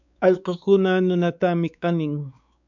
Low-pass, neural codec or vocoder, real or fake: 7.2 kHz; codec, 16 kHz, 4 kbps, X-Codec, WavLM features, trained on Multilingual LibriSpeech; fake